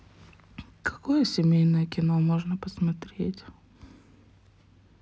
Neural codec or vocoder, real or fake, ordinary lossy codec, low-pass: none; real; none; none